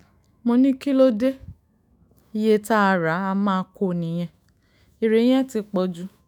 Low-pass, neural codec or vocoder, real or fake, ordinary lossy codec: 19.8 kHz; autoencoder, 48 kHz, 128 numbers a frame, DAC-VAE, trained on Japanese speech; fake; none